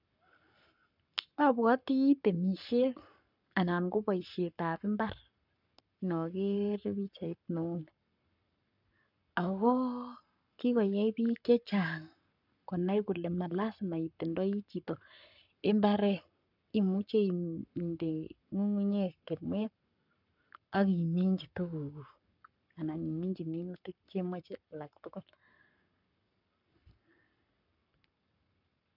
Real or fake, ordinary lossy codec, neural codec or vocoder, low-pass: fake; none; codec, 44.1 kHz, 7.8 kbps, Pupu-Codec; 5.4 kHz